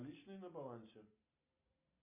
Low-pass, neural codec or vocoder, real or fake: 3.6 kHz; none; real